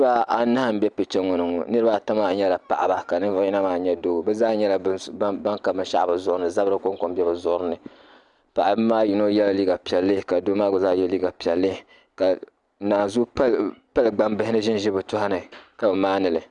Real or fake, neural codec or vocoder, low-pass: real; none; 10.8 kHz